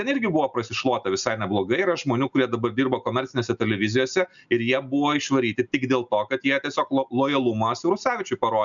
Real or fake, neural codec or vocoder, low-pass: real; none; 7.2 kHz